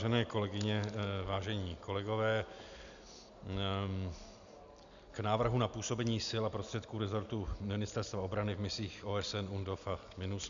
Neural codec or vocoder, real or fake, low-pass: none; real; 7.2 kHz